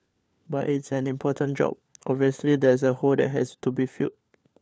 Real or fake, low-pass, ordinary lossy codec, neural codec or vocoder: fake; none; none; codec, 16 kHz, 4 kbps, FunCodec, trained on LibriTTS, 50 frames a second